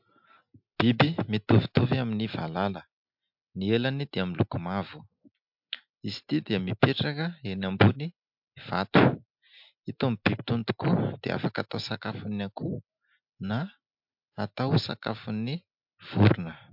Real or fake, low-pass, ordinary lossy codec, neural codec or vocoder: real; 5.4 kHz; MP3, 48 kbps; none